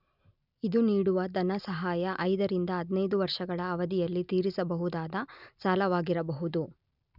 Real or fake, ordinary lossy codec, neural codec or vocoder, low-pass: real; none; none; 5.4 kHz